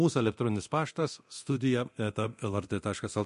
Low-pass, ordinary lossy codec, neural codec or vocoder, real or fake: 10.8 kHz; MP3, 48 kbps; codec, 24 kHz, 0.9 kbps, DualCodec; fake